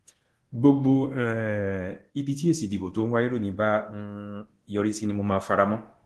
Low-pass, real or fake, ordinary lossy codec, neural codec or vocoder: 10.8 kHz; fake; Opus, 16 kbps; codec, 24 kHz, 0.9 kbps, DualCodec